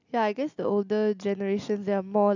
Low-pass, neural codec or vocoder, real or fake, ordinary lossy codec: 7.2 kHz; none; real; none